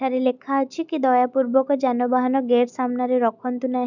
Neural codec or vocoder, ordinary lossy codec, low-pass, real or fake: none; none; 7.2 kHz; real